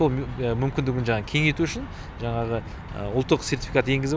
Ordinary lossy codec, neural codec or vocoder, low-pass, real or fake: none; none; none; real